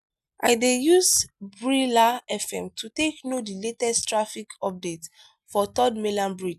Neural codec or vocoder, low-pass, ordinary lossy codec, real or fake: none; 14.4 kHz; none; real